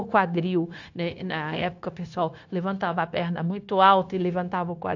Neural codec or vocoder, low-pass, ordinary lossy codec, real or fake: codec, 16 kHz, 0.9 kbps, LongCat-Audio-Codec; 7.2 kHz; AAC, 48 kbps; fake